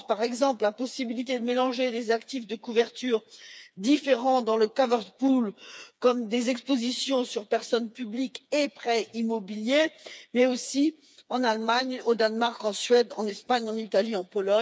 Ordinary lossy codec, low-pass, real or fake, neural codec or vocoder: none; none; fake; codec, 16 kHz, 4 kbps, FreqCodec, smaller model